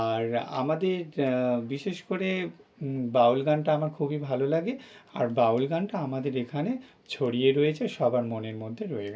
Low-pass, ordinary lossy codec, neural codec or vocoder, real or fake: none; none; none; real